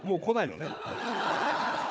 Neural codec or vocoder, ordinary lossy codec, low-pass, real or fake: codec, 16 kHz, 4 kbps, FunCodec, trained on Chinese and English, 50 frames a second; none; none; fake